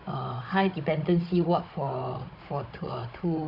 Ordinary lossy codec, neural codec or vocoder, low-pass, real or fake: none; codec, 16 kHz, 16 kbps, FunCodec, trained on Chinese and English, 50 frames a second; 5.4 kHz; fake